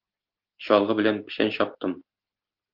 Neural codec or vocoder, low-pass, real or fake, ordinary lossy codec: none; 5.4 kHz; real; Opus, 32 kbps